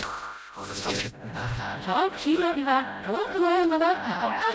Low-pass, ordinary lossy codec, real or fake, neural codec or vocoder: none; none; fake; codec, 16 kHz, 0.5 kbps, FreqCodec, smaller model